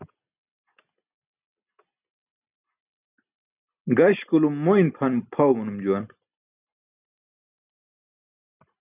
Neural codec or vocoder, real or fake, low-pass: none; real; 3.6 kHz